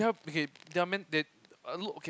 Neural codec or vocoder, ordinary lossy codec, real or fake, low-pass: none; none; real; none